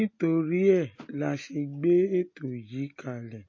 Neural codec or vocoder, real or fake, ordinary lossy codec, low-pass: none; real; MP3, 32 kbps; 7.2 kHz